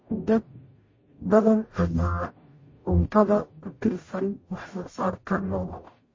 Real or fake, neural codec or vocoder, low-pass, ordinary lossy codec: fake; codec, 44.1 kHz, 0.9 kbps, DAC; 7.2 kHz; MP3, 32 kbps